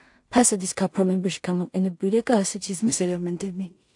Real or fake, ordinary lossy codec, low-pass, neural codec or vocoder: fake; AAC, 64 kbps; 10.8 kHz; codec, 16 kHz in and 24 kHz out, 0.4 kbps, LongCat-Audio-Codec, two codebook decoder